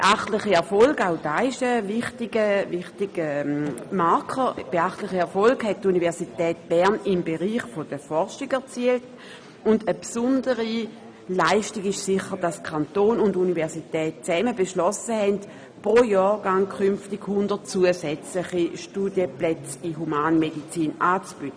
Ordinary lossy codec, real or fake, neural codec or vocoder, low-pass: none; real; none; none